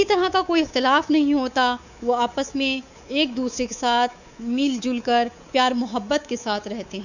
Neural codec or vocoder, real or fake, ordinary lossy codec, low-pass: codec, 24 kHz, 3.1 kbps, DualCodec; fake; none; 7.2 kHz